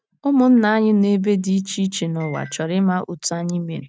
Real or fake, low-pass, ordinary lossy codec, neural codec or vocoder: real; none; none; none